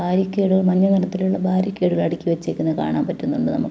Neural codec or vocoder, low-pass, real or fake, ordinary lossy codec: none; none; real; none